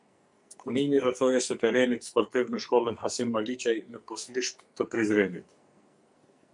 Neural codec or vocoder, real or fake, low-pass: codec, 44.1 kHz, 2.6 kbps, SNAC; fake; 10.8 kHz